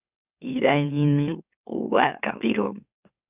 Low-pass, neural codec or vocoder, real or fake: 3.6 kHz; autoencoder, 44.1 kHz, a latent of 192 numbers a frame, MeloTTS; fake